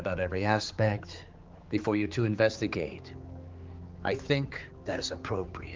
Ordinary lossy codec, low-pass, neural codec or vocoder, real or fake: Opus, 32 kbps; 7.2 kHz; codec, 16 kHz, 4 kbps, X-Codec, HuBERT features, trained on general audio; fake